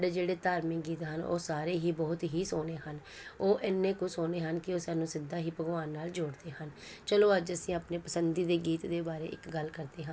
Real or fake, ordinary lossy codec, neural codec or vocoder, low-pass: real; none; none; none